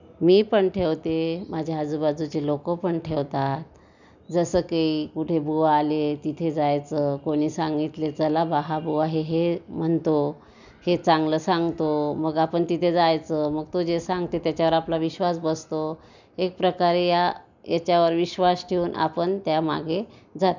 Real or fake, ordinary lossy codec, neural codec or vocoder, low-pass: real; none; none; 7.2 kHz